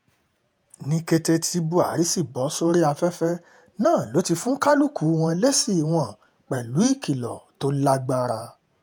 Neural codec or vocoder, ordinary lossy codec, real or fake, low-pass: vocoder, 48 kHz, 128 mel bands, Vocos; none; fake; none